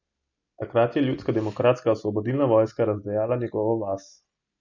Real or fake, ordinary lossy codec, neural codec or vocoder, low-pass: real; none; none; 7.2 kHz